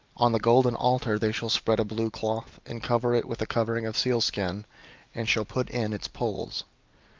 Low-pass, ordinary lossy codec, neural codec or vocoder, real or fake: 7.2 kHz; Opus, 24 kbps; none; real